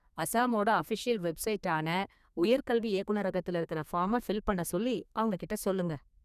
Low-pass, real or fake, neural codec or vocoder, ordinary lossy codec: 14.4 kHz; fake; codec, 32 kHz, 1.9 kbps, SNAC; none